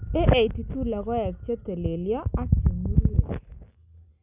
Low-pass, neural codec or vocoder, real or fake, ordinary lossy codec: 3.6 kHz; none; real; none